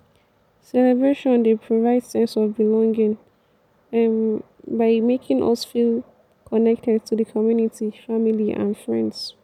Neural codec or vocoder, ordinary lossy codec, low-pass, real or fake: none; none; 19.8 kHz; real